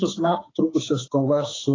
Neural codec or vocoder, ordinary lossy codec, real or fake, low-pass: autoencoder, 48 kHz, 32 numbers a frame, DAC-VAE, trained on Japanese speech; AAC, 32 kbps; fake; 7.2 kHz